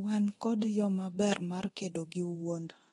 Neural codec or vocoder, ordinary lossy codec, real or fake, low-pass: codec, 24 kHz, 0.9 kbps, DualCodec; AAC, 32 kbps; fake; 10.8 kHz